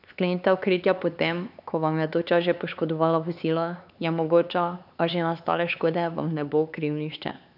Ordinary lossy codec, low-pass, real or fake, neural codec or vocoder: none; 5.4 kHz; fake; codec, 16 kHz, 4 kbps, X-Codec, HuBERT features, trained on LibriSpeech